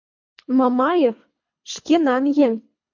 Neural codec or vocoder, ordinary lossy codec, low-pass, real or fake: codec, 24 kHz, 3 kbps, HILCodec; MP3, 48 kbps; 7.2 kHz; fake